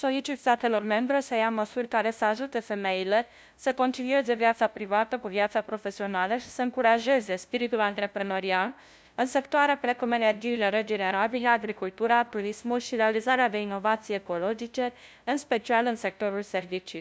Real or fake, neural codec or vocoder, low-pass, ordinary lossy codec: fake; codec, 16 kHz, 0.5 kbps, FunCodec, trained on LibriTTS, 25 frames a second; none; none